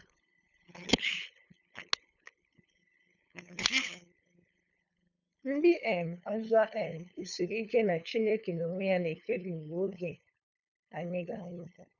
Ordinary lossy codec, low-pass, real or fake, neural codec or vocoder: none; 7.2 kHz; fake; codec, 16 kHz, 2 kbps, FunCodec, trained on LibriTTS, 25 frames a second